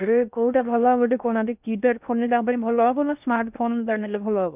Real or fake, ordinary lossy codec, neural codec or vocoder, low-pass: fake; none; codec, 16 kHz in and 24 kHz out, 0.6 kbps, FocalCodec, streaming, 4096 codes; 3.6 kHz